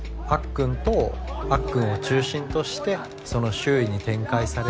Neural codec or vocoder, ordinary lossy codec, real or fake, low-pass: none; none; real; none